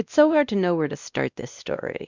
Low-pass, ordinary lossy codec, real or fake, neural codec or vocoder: 7.2 kHz; Opus, 64 kbps; fake; codec, 16 kHz, 1 kbps, X-Codec, WavLM features, trained on Multilingual LibriSpeech